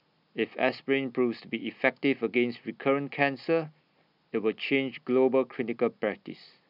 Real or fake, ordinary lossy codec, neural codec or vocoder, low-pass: real; none; none; 5.4 kHz